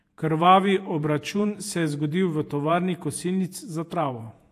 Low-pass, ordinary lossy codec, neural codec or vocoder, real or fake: 14.4 kHz; AAC, 64 kbps; vocoder, 48 kHz, 128 mel bands, Vocos; fake